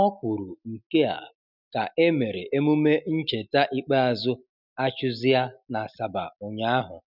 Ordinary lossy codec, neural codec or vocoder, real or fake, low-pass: none; none; real; 5.4 kHz